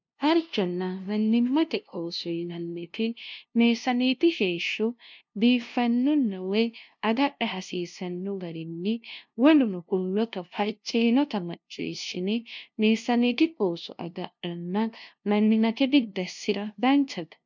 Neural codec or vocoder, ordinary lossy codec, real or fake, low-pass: codec, 16 kHz, 0.5 kbps, FunCodec, trained on LibriTTS, 25 frames a second; MP3, 64 kbps; fake; 7.2 kHz